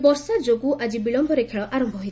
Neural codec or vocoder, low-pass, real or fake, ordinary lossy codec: none; none; real; none